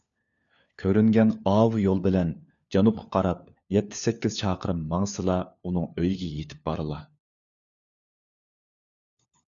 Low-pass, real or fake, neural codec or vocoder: 7.2 kHz; fake; codec, 16 kHz, 4 kbps, FunCodec, trained on LibriTTS, 50 frames a second